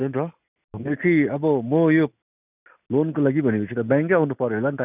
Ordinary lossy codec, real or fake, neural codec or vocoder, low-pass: none; real; none; 3.6 kHz